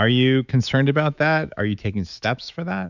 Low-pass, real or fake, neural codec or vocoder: 7.2 kHz; fake; autoencoder, 48 kHz, 128 numbers a frame, DAC-VAE, trained on Japanese speech